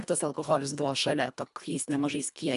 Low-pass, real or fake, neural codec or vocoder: 10.8 kHz; fake; codec, 24 kHz, 1.5 kbps, HILCodec